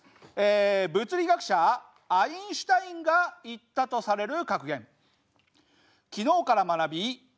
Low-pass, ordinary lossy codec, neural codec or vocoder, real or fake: none; none; none; real